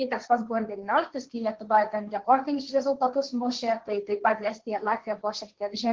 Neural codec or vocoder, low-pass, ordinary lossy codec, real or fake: codec, 16 kHz, 1.1 kbps, Voila-Tokenizer; 7.2 kHz; Opus, 16 kbps; fake